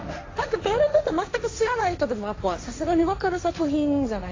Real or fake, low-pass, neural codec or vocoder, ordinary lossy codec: fake; 7.2 kHz; codec, 16 kHz, 1.1 kbps, Voila-Tokenizer; AAC, 32 kbps